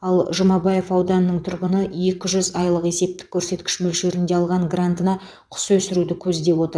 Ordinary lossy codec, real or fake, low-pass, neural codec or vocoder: none; fake; none; vocoder, 22.05 kHz, 80 mel bands, WaveNeXt